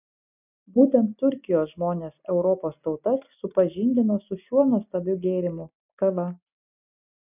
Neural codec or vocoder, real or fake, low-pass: none; real; 3.6 kHz